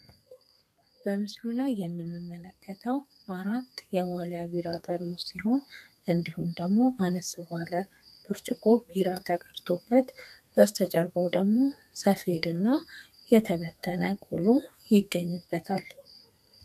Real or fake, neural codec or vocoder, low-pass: fake; codec, 32 kHz, 1.9 kbps, SNAC; 14.4 kHz